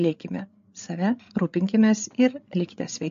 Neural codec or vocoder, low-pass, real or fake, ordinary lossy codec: codec, 16 kHz, 8 kbps, FreqCodec, larger model; 7.2 kHz; fake; MP3, 48 kbps